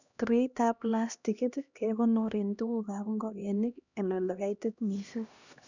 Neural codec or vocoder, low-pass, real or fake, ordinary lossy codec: codec, 16 kHz, 1 kbps, X-Codec, HuBERT features, trained on LibriSpeech; 7.2 kHz; fake; none